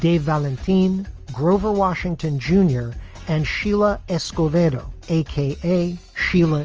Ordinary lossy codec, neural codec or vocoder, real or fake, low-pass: Opus, 24 kbps; none; real; 7.2 kHz